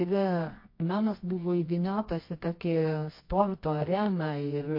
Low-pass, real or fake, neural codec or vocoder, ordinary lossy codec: 5.4 kHz; fake; codec, 24 kHz, 0.9 kbps, WavTokenizer, medium music audio release; MP3, 32 kbps